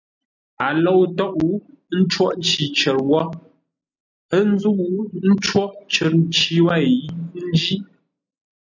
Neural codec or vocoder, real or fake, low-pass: none; real; 7.2 kHz